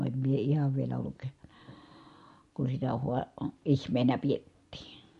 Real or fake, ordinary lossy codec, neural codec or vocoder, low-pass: real; MP3, 48 kbps; none; 14.4 kHz